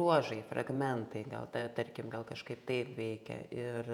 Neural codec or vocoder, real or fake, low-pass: vocoder, 48 kHz, 128 mel bands, Vocos; fake; 19.8 kHz